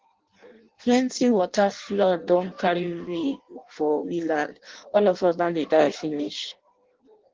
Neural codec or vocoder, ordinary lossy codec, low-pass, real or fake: codec, 16 kHz in and 24 kHz out, 0.6 kbps, FireRedTTS-2 codec; Opus, 16 kbps; 7.2 kHz; fake